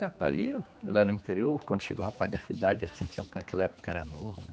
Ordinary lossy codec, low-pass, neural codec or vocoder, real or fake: none; none; codec, 16 kHz, 2 kbps, X-Codec, HuBERT features, trained on general audio; fake